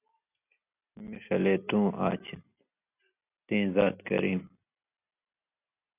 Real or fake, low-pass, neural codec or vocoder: real; 3.6 kHz; none